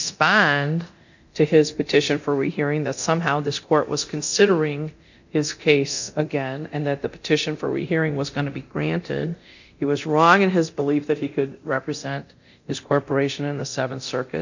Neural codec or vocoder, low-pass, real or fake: codec, 24 kHz, 0.9 kbps, DualCodec; 7.2 kHz; fake